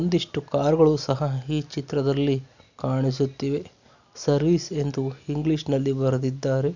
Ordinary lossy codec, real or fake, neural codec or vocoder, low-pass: none; real; none; 7.2 kHz